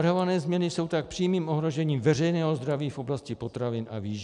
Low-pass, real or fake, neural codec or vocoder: 10.8 kHz; real; none